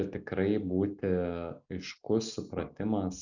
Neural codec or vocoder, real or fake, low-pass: none; real; 7.2 kHz